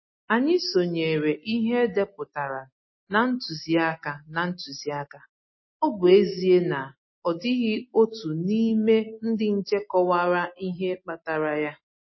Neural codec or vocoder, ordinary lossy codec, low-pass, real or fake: none; MP3, 24 kbps; 7.2 kHz; real